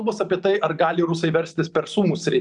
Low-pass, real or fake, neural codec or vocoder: 10.8 kHz; fake; vocoder, 44.1 kHz, 128 mel bands every 512 samples, BigVGAN v2